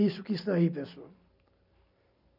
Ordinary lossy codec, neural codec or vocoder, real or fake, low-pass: none; none; real; 5.4 kHz